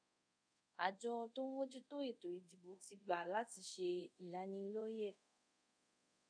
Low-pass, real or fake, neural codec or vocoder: 9.9 kHz; fake; codec, 24 kHz, 0.5 kbps, DualCodec